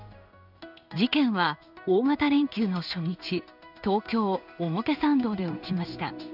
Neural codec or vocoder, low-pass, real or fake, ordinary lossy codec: codec, 16 kHz in and 24 kHz out, 1 kbps, XY-Tokenizer; 5.4 kHz; fake; none